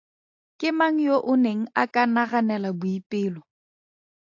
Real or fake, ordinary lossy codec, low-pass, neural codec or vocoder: real; AAC, 48 kbps; 7.2 kHz; none